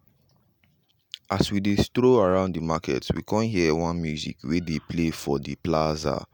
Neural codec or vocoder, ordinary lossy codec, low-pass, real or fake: none; none; none; real